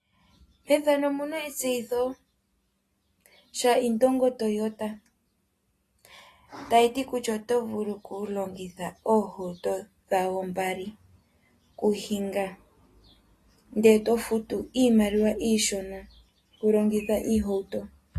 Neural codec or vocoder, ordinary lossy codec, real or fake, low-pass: none; AAC, 48 kbps; real; 14.4 kHz